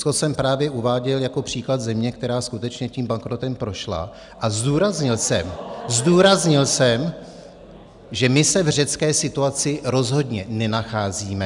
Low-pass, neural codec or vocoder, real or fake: 10.8 kHz; none; real